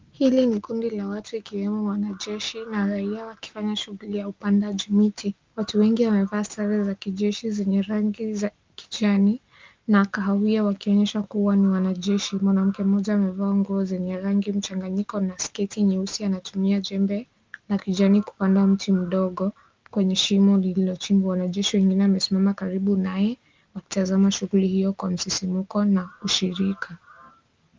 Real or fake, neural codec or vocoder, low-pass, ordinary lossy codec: real; none; 7.2 kHz; Opus, 32 kbps